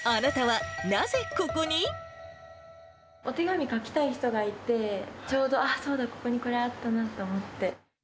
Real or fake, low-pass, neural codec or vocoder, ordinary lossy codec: real; none; none; none